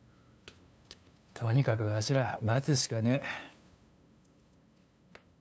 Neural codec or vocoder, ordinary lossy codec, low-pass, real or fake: codec, 16 kHz, 2 kbps, FunCodec, trained on LibriTTS, 25 frames a second; none; none; fake